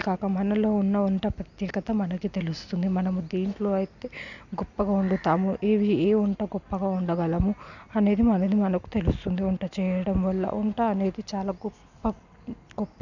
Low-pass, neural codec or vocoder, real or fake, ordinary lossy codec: 7.2 kHz; none; real; none